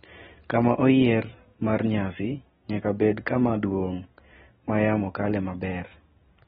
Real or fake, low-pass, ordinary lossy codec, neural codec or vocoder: real; 19.8 kHz; AAC, 16 kbps; none